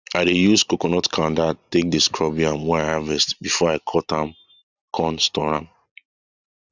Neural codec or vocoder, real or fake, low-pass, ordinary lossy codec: none; real; 7.2 kHz; none